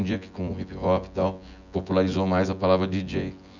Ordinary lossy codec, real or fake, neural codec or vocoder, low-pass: none; fake; vocoder, 24 kHz, 100 mel bands, Vocos; 7.2 kHz